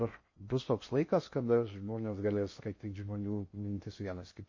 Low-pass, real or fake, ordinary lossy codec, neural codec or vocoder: 7.2 kHz; fake; MP3, 32 kbps; codec, 16 kHz in and 24 kHz out, 0.6 kbps, FocalCodec, streaming, 2048 codes